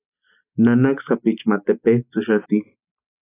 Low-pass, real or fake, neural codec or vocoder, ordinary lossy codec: 3.6 kHz; real; none; AAC, 24 kbps